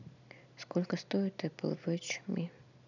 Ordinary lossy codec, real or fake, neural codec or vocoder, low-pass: none; real; none; 7.2 kHz